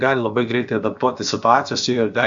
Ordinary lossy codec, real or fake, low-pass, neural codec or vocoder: Opus, 64 kbps; fake; 7.2 kHz; codec, 16 kHz, about 1 kbps, DyCAST, with the encoder's durations